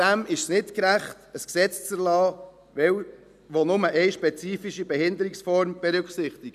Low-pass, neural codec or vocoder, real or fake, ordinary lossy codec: 14.4 kHz; none; real; none